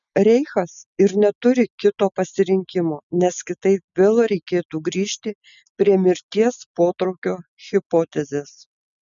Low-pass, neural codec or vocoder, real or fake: 7.2 kHz; none; real